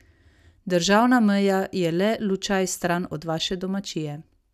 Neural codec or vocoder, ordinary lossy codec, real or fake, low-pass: none; none; real; 14.4 kHz